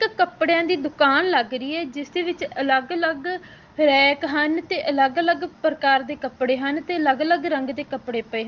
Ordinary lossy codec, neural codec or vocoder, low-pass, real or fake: Opus, 24 kbps; none; 7.2 kHz; real